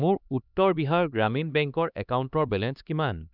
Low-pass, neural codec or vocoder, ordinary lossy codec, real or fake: 5.4 kHz; codec, 16 kHz, 2 kbps, X-Codec, HuBERT features, trained on LibriSpeech; none; fake